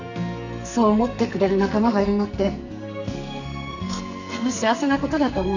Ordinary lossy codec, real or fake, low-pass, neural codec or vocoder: none; fake; 7.2 kHz; codec, 44.1 kHz, 2.6 kbps, SNAC